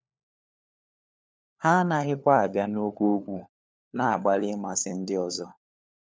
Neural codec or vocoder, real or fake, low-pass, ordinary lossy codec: codec, 16 kHz, 4 kbps, FunCodec, trained on LibriTTS, 50 frames a second; fake; none; none